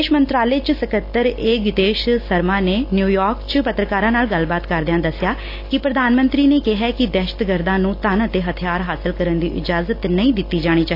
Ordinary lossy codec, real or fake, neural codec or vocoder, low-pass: AAC, 32 kbps; real; none; 5.4 kHz